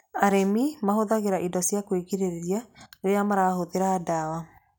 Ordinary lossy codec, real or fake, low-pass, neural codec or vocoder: none; real; none; none